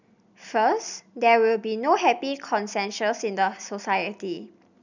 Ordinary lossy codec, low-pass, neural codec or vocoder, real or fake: none; 7.2 kHz; none; real